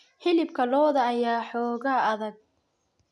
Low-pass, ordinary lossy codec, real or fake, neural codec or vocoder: none; none; real; none